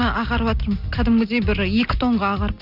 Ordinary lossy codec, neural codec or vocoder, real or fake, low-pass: none; none; real; 5.4 kHz